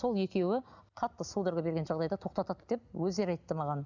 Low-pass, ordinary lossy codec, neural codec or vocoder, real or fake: 7.2 kHz; none; none; real